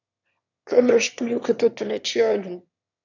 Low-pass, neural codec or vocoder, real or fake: 7.2 kHz; autoencoder, 22.05 kHz, a latent of 192 numbers a frame, VITS, trained on one speaker; fake